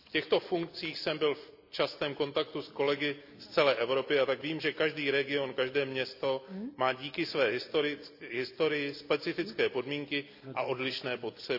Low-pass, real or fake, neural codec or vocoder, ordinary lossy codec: 5.4 kHz; real; none; AAC, 48 kbps